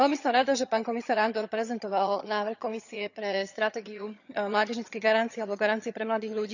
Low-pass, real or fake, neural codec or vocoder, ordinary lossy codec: 7.2 kHz; fake; vocoder, 22.05 kHz, 80 mel bands, HiFi-GAN; none